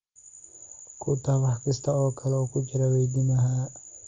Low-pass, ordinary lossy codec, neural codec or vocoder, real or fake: 7.2 kHz; Opus, 32 kbps; none; real